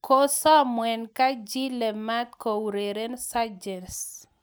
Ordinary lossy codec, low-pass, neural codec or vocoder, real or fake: none; none; none; real